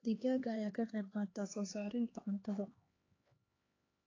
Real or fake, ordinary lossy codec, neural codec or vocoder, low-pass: fake; AAC, 32 kbps; codec, 16 kHz, 2 kbps, X-Codec, HuBERT features, trained on LibriSpeech; 7.2 kHz